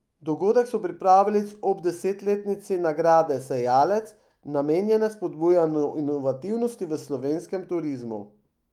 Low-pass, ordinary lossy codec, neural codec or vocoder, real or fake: 19.8 kHz; Opus, 32 kbps; autoencoder, 48 kHz, 128 numbers a frame, DAC-VAE, trained on Japanese speech; fake